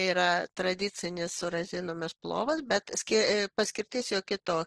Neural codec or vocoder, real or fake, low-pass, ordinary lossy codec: none; real; 10.8 kHz; Opus, 16 kbps